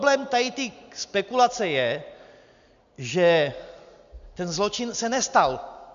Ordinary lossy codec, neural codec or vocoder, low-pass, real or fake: AAC, 96 kbps; none; 7.2 kHz; real